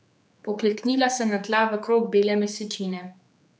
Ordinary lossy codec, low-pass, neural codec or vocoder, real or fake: none; none; codec, 16 kHz, 4 kbps, X-Codec, HuBERT features, trained on general audio; fake